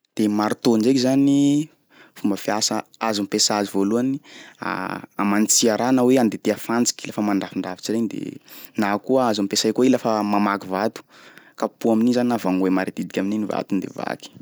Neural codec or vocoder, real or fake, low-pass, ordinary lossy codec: none; real; none; none